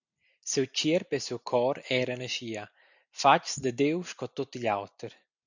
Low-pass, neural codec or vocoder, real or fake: 7.2 kHz; none; real